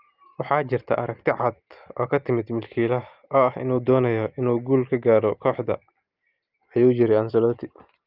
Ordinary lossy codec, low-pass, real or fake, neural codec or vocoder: Opus, 32 kbps; 5.4 kHz; real; none